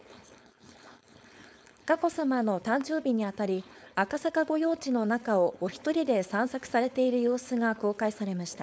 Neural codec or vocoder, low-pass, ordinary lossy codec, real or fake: codec, 16 kHz, 4.8 kbps, FACodec; none; none; fake